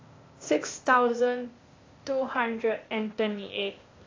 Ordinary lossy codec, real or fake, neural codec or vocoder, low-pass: AAC, 32 kbps; fake; codec, 16 kHz, 0.8 kbps, ZipCodec; 7.2 kHz